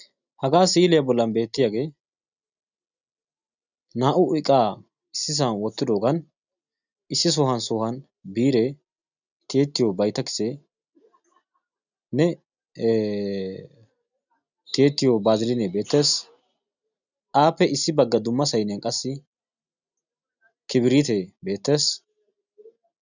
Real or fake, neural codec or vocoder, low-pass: real; none; 7.2 kHz